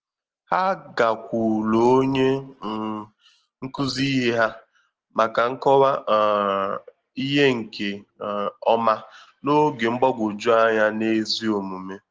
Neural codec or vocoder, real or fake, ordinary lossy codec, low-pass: none; real; Opus, 16 kbps; 7.2 kHz